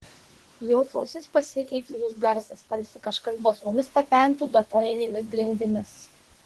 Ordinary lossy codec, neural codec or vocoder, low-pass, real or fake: Opus, 16 kbps; codec, 24 kHz, 1 kbps, SNAC; 10.8 kHz; fake